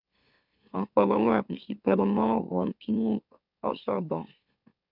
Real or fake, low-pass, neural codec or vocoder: fake; 5.4 kHz; autoencoder, 44.1 kHz, a latent of 192 numbers a frame, MeloTTS